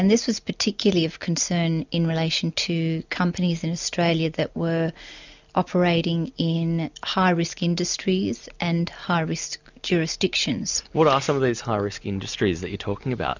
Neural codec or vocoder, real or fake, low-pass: none; real; 7.2 kHz